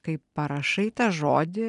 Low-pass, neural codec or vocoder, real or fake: 10.8 kHz; none; real